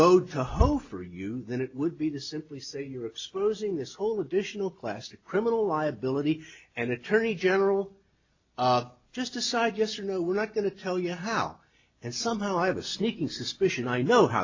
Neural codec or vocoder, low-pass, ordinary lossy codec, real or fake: none; 7.2 kHz; AAC, 48 kbps; real